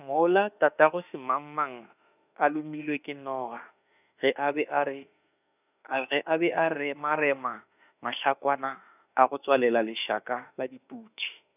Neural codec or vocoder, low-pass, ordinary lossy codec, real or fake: autoencoder, 48 kHz, 32 numbers a frame, DAC-VAE, trained on Japanese speech; 3.6 kHz; none; fake